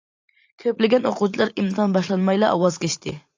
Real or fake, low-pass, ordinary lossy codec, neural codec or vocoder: fake; 7.2 kHz; AAC, 48 kbps; vocoder, 44.1 kHz, 128 mel bands every 512 samples, BigVGAN v2